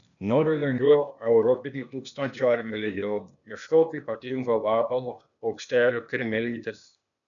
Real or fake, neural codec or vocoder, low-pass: fake; codec, 16 kHz, 0.8 kbps, ZipCodec; 7.2 kHz